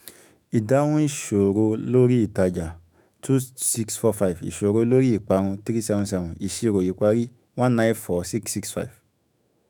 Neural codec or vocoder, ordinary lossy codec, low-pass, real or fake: autoencoder, 48 kHz, 128 numbers a frame, DAC-VAE, trained on Japanese speech; none; none; fake